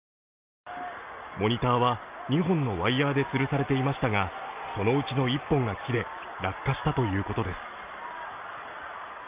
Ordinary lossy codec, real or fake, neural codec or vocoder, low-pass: Opus, 24 kbps; real; none; 3.6 kHz